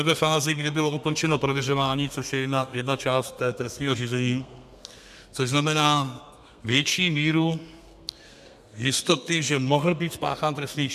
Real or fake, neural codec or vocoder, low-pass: fake; codec, 32 kHz, 1.9 kbps, SNAC; 14.4 kHz